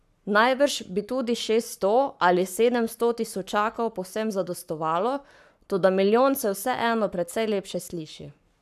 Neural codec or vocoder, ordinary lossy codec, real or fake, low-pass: codec, 44.1 kHz, 7.8 kbps, Pupu-Codec; none; fake; 14.4 kHz